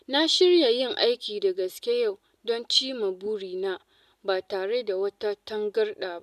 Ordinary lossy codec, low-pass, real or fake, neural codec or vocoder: none; 14.4 kHz; real; none